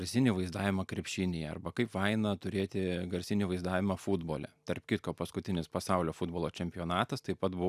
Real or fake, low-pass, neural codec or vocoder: real; 14.4 kHz; none